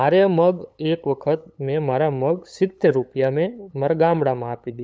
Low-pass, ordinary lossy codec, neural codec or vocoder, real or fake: none; none; codec, 16 kHz, 8 kbps, FunCodec, trained on LibriTTS, 25 frames a second; fake